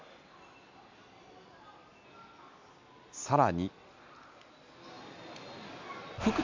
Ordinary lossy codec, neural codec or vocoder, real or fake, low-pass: MP3, 64 kbps; none; real; 7.2 kHz